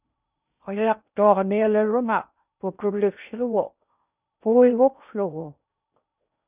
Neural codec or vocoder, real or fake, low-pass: codec, 16 kHz in and 24 kHz out, 0.6 kbps, FocalCodec, streaming, 2048 codes; fake; 3.6 kHz